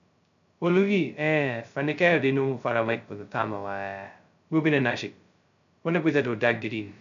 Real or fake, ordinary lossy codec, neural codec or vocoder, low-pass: fake; none; codec, 16 kHz, 0.2 kbps, FocalCodec; 7.2 kHz